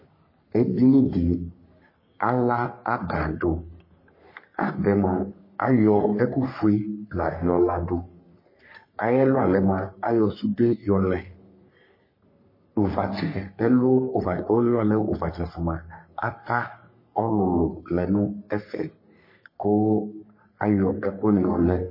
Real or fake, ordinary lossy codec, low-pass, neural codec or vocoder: fake; MP3, 24 kbps; 5.4 kHz; codec, 44.1 kHz, 3.4 kbps, Pupu-Codec